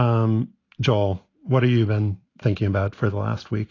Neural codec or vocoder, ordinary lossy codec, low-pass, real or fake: none; AAC, 32 kbps; 7.2 kHz; real